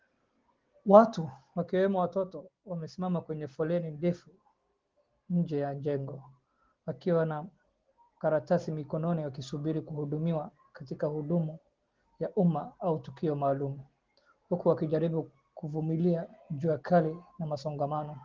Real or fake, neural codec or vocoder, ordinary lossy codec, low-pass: real; none; Opus, 16 kbps; 7.2 kHz